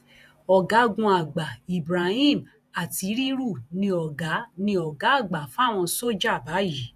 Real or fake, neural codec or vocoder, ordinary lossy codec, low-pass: fake; vocoder, 48 kHz, 128 mel bands, Vocos; none; 14.4 kHz